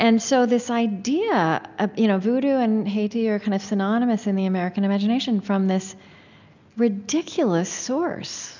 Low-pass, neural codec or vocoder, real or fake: 7.2 kHz; none; real